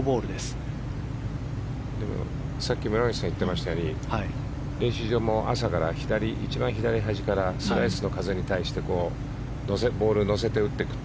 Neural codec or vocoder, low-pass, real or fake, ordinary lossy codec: none; none; real; none